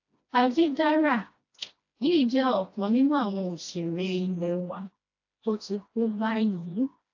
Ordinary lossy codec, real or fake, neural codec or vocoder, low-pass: AAC, 48 kbps; fake; codec, 16 kHz, 1 kbps, FreqCodec, smaller model; 7.2 kHz